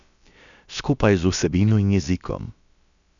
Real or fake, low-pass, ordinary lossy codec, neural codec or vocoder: fake; 7.2 kHz; MP3, 96 kbps; codec, 16 kHz, about 1 kbps, DyCAST, with the encoder's durations